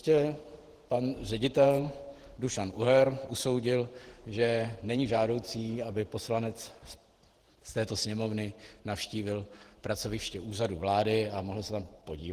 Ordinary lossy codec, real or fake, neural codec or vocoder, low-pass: Opus, 16 kbps; fake; vocoder, 48 kHz, 128 mel bands, Vocos; 14.4 kHz